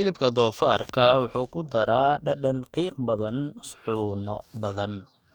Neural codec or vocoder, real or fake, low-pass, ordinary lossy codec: codec, 44.1 kHz, 2.6 kbps, DAC; fake; 19.8 kHz; none